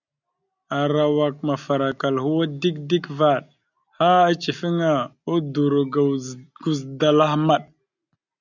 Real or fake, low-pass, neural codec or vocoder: real; 7.2 kHz; none